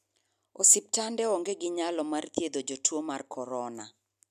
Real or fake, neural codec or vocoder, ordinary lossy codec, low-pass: real; none; none; 14.4 kHz